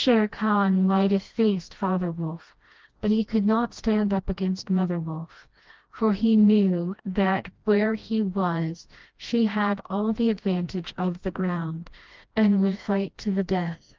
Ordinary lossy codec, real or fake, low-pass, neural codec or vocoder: Opus, 32 kbps; fake; 7.2 kHz; codec, 16 kHz, 1 kbps, FreqCodec, smaller model